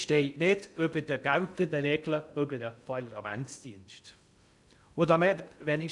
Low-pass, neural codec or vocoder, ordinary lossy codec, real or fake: 10.8 kHz; codec, 16 kHz in and 24 kHz out, 0.6 kbps, FocalCodec, streaming, 2048 codes; none; fake